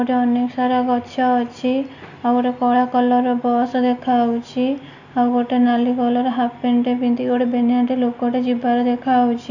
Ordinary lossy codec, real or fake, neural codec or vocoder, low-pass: none; real; none; 7.2 kHz